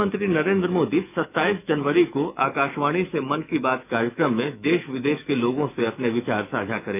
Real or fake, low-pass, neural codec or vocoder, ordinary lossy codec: fake; 3.6 kHz; autoencoder, 48 kHz, 128 numbers a frame, DAC-VAE, trained on Japanese speech; none